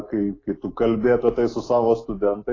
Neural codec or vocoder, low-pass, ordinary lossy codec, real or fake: none; 7.2 kHz; AAC, 32 kbps; real